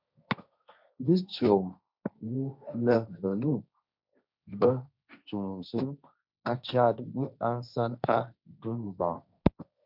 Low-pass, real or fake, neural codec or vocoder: 5.4 kHz; fake; codec, 16 kHz, 1.1 kbps, Voila-Tokenizer